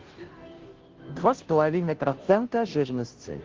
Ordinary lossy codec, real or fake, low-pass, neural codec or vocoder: Opus, 16 kbps; fake; 7.2 kHz; codec, 16 kHz, 0.5 kbps, FunCodec, trained on Chinese and English, 25 frames a second